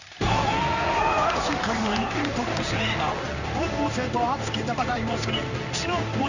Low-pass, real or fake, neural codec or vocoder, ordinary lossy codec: 7.2 kHz; fake; codec, 16 kHz in and 24 kHz out, 1 kbps, XY-Tokenizer; none